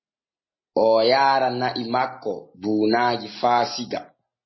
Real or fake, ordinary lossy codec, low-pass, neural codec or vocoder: real; MP3, 24 kbps; 7.2 kHz; none